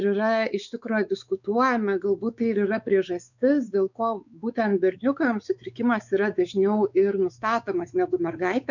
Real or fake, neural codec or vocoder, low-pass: fake; codec, 16 kHz, 4 kbps, X-Codec, WavLM features, trained on Multilingual LibriSpeech; 7.2 kHz